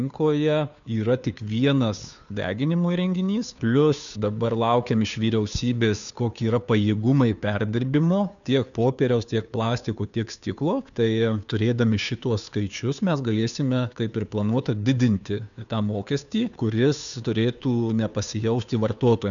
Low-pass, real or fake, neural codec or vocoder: 7.2 kHz; fake; codec, 16 kHz, 2 kbps, FunCodec, trained on Chinese and English, 25 frames a second